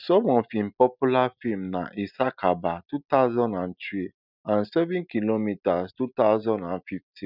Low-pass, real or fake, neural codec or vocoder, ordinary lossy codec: 5.4 kHz; real; none; none